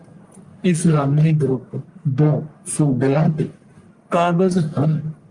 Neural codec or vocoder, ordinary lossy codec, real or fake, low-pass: codec, 44.1 kHz, 1.7 kbps, Pupu-Codec; Opus, 32 kbps; fake; 10.8 kHz